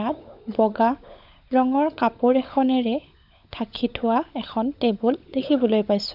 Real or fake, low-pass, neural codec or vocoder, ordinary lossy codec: fake; 5.4 kHz; codec, 16 kHz, 16 kbps, FunCodec, trained on LibriTTS, 50 frames a second; none